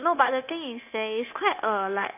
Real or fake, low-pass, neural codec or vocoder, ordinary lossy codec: fake; 3.6 kHz; codec, 16 kHz, 0.9 kbps, LongCat-Audio-Codec; none